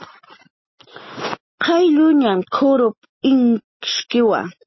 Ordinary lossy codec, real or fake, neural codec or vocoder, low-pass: MP3, 24 kbps; real; none; 7.2 kHz